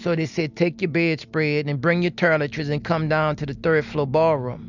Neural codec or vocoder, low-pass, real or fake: none; 7.2 kHz; real